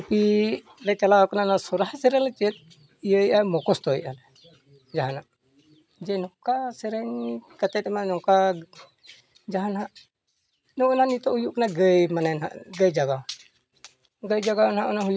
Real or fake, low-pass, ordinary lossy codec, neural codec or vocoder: real; none; none; none